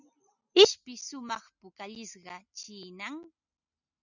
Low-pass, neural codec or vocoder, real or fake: 7.2 kHz; none; real